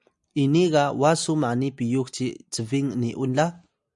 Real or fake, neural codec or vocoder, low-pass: real; none; 10.8 kHz